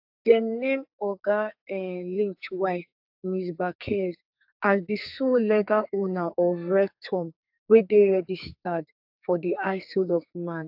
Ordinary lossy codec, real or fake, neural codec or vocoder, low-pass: AAC, 48 kbps; fake; codec, 44.1 kHz, 2.6 kbps, SNAC; 5.4 kHz